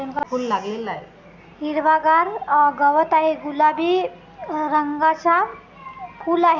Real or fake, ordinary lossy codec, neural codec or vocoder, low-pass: real; none; none; 7.2 kHz